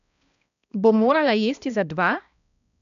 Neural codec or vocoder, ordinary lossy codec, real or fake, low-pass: codec, 16 kHz, 1 kbps, X-Codec, HuBERT features, trained on balanced general audio; none; fake; 7.2 kHz